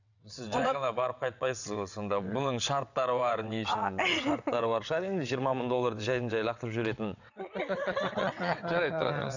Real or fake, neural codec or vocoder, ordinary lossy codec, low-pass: fake; vocoder, 22.05 kHz, 80 mel bands, WaveNeXt; none; 7.2 kHz